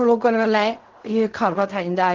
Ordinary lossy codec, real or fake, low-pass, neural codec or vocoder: Opus, 16 kbps; fake; 7.2 kHz; codec, 16 kHz in and 24 kHz out, 0.4 kbps, LongCat-Audio-Codec, fine tuned four codebook decoder